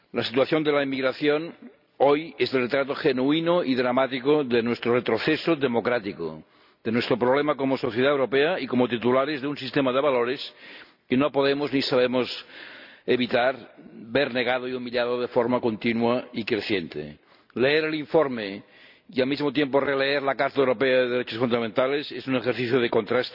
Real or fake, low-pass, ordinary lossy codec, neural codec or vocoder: real; 5.4 kHz; none; none